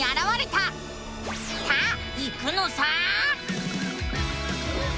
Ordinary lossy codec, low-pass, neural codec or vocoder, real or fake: none; none; none; real